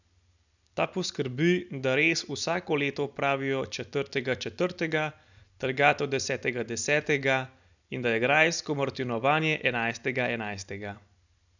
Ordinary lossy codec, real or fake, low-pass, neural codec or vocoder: none; real; 7.2 kHz; none